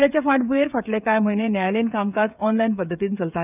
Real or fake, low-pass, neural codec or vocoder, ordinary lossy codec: fake; 3.6 kHz; codec, 16 kHz, 16 kbps, FreqCodec, smaller model; none